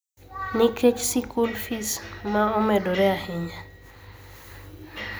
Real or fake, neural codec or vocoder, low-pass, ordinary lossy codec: real; none; none; none